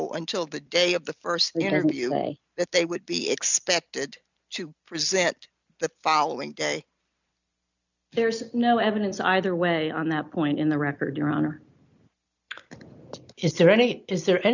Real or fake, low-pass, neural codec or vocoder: real; 7.2 kHz; none